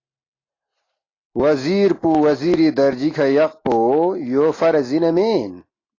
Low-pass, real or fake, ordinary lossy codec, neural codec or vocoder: 7.2 kHz; real; AAC, 32 kbps; none